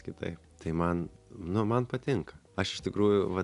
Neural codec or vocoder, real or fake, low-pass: none; real; 10.8 kHz